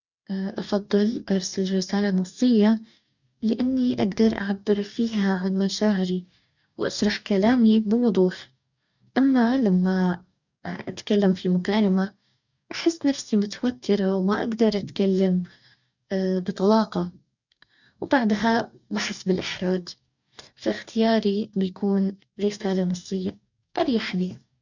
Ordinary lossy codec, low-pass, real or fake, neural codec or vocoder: none; 7.2 kHz; fake; codec, 44.1 kHz, 2.6 kbps, DAC